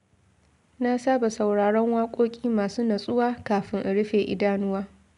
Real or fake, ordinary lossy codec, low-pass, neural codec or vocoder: real; none; 10.8 kHz; none